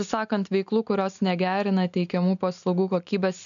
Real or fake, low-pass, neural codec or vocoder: real; 7.2 kHz; none